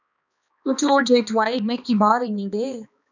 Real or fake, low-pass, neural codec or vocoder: fake; 7.2 kHz; codec, 16 kHz, 2 kbps, X-Codec, HuBERT features, trained on balanced general audio